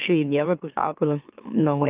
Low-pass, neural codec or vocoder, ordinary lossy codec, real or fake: 3.6 kHz; autoencoder, 44.1 kHz, a latent of 192 numbers a frame, MeloTTS; Opus, 24 kbps; fake